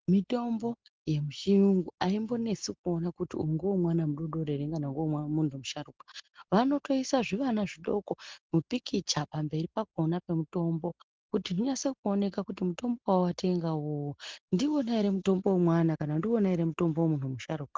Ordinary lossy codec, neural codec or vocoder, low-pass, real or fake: Opus, 16 kbps; none; 7.2 kHz; real